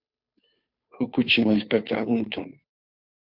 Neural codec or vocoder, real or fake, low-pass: codec, 16 kHz, 2 kbps, FunCodec, trained on Chinese and English, 25 frames a second; fake; 5.4 kHz